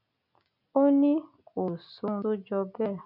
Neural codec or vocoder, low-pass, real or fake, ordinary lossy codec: none; 5.4 kHz; real; none